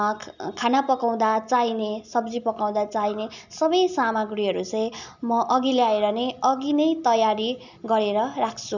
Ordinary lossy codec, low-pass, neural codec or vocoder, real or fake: none; 7.2 kHz; none; real